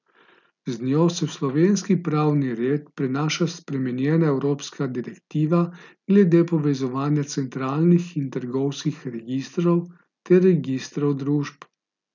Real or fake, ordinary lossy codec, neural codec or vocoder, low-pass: real; none; none; 7.2 kHz